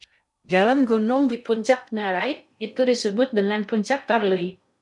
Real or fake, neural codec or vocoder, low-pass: fake; codec, 16 kHz in and 24 kHz out, 0.6 kbps, FocalCodec, streaming, 2048 codes; 10.8 kHz